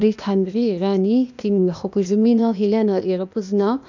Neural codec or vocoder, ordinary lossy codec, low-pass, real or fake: codec, 16 kHz, 0.8 kbps, ZipCodec; none; 7.2 kHz; fake